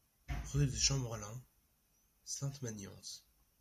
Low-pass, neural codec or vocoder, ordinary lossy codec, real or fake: 14.4 kHz; none; MP3, 96 kbps; real